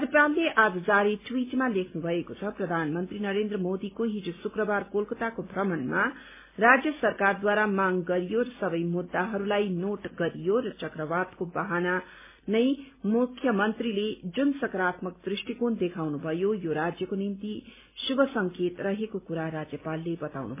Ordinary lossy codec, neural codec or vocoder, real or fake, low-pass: MP3, 24 kbps; none; real; 3.6 kHz